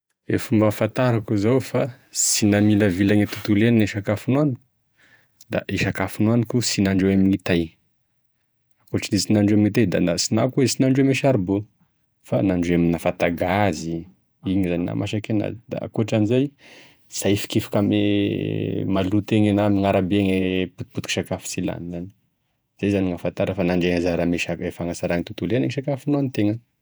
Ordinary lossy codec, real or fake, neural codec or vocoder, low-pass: none; fake; vocoder, 48 kHz, 128 mel bands, Vocos; none